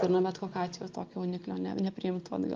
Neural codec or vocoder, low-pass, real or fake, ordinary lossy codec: none; 7.2 kHz; real; Opus, 32 kbps